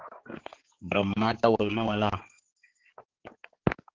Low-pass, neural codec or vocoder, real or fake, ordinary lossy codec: 7.2 kHz; codec, 16 kHz, 4 kbps, X-Codec, HuBERT features, trained on balanced general audio; fake; Opus, 16 kbps